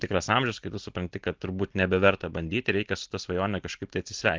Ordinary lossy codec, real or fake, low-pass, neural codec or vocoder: Opus, 16 kbps; real; 7.2 kHz; none